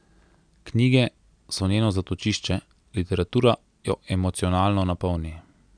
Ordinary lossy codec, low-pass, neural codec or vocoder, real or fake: none; 9.9 kHz; none; real